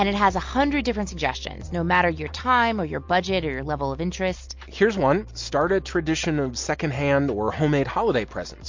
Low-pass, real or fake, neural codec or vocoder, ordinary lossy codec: 7.2 kHz; real; none; MP3, 48 kbps